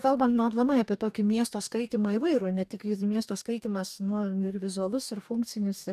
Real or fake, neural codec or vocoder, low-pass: fake; codec, 44.1 kHz, 2.6 kbps, DAC; 14.4 kHz